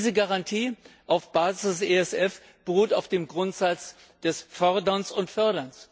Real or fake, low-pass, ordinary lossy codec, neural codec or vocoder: real; none; none; none